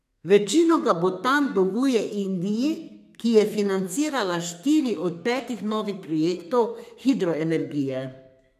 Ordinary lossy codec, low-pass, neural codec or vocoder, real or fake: none; 14.4 kHz; codec, 32 kHz, 1.9 kbps, SNAC; fake